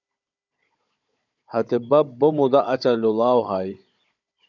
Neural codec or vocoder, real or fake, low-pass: codec, 16 kHz, 16 kbps, FunCodec, trained on Chinese and English, 50 frames a second; fake; 7.2 kHz